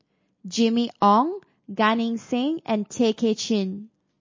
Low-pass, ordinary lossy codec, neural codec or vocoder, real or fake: 7.2 kHz; MP3, 32 kbps; none; real